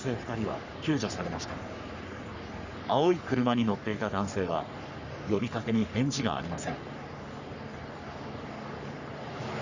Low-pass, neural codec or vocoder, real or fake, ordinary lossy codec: 7.2 kHz; codec, 44.1 kHz, 3.4 kbps, Pupu-Codec; fake; Opus, 64 kbps